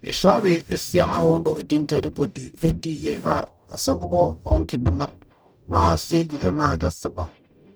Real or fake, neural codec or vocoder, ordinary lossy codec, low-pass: fake; codec, 44.1 kHz, 0.9 kbps, DAC; none; none